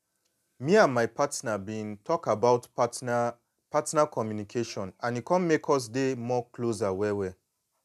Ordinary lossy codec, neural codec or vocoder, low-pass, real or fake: none; none; 14.4 kHz; real